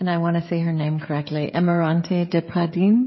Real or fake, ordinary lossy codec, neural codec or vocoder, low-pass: fake; MP3, 24 kbps; codec, 16 kHz, 16 kbps, FreqCodec, smaller model; 7.2 kHz